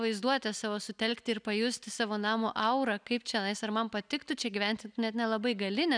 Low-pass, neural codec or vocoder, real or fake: 9.9 kHz; none; real